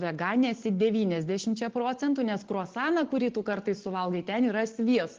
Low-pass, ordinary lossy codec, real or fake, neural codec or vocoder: 7.2 kHz; Opus, 16 kbps; real; none